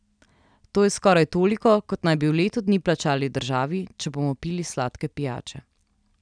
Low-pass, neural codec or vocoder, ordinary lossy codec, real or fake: 9.9 kHz; none; none; real